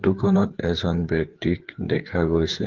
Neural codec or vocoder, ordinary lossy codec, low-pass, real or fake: codec, 16 kHz, 4 kbps, FreqCodec, larger model; Opus, 16 kbps; 7.2 kHz; fake